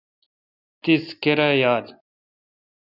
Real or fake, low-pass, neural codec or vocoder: real; 5.4 kHz; none